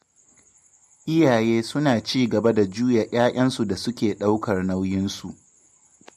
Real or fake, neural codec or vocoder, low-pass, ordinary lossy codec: real; none; 14.4 kHz; MP3, 64 kbps